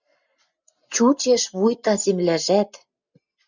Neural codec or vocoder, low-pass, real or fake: none; 7.2 kHz; real